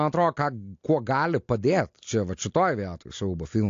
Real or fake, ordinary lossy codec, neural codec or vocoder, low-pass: real; AAC, 48 kbps; none; 7.2 kHz